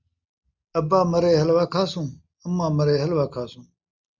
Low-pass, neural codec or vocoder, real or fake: 7.2 kHz; none; real